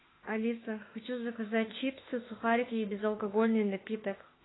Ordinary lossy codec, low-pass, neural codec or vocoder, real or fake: AAC, 16 kbps; 7.2 kHz; autoencoder, 48 kHz, 32 numbers a frame, DAC-VAE, trained on Japanese speech; fake